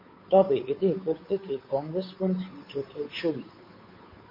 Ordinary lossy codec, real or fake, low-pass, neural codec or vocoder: MP3, 24 kbps; fake; 5.4 kHz; codec, 16 kHz, 8 kbps, FunCodec, trained on Chinese and English, 25 frames a second